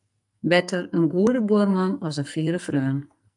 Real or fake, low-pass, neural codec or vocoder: fake; 10.8 kHz; codec, 44.1 kHz, 2.6 kbps, SNAC